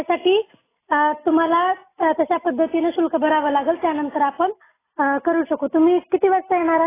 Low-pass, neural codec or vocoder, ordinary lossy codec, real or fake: 3.6 kHz; none; AAC, 16 kbps; real